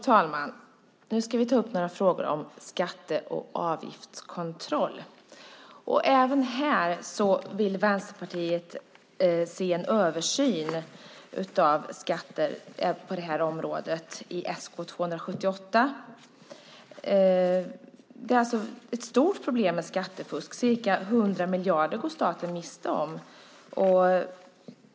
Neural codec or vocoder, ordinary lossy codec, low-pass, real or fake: none; none; none; real